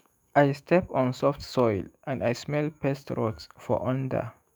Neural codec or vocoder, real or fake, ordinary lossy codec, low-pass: autoencoder, 48 kHz, 128 numbers a frame, DAC-VAE, trained on Japanese speech; fake; none; none